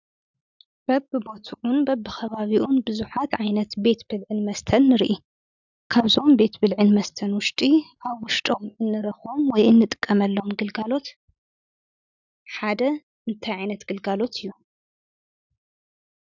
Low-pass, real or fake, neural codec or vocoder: 7.2 kHz; real; none